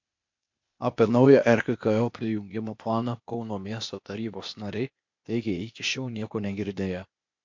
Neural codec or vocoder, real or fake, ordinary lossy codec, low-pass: codec, 16 kHz, 0.8 kbps, ZipCodec; fake; MP3, 48 kbps; 7.2 kHz